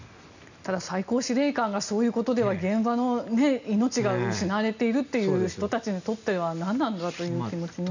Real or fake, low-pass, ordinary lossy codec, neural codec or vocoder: real; 7.2 kHz; none; none